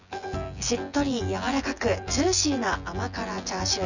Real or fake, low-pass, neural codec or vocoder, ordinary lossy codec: fake; 7.2 kHz; vocoder, 24 kHz, 100 mel bands, Vocos; none